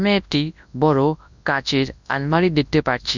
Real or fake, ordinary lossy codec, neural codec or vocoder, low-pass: fake; none; codec, 24 kHz, 0.9 kbps, WavTokenizer, large speech release; 7.2 kHz